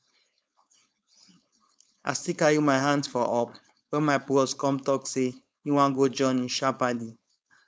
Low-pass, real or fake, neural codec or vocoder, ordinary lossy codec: none; fake; codec, 16 kHz, 4.8 kbps, FACodec; none